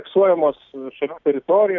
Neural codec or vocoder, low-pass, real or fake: none; 7.2 kHz; real